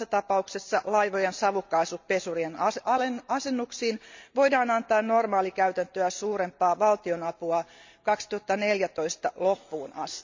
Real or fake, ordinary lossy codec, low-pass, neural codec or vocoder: real; MP3, 64 kbps; 7.2 kHz; none